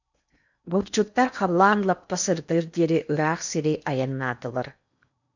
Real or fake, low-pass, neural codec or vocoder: fake; 7.2 kHz; codec, 16 kHz in and 24 kHz out, 0.8 kbps, FocalCodec, streaming, 65536 codes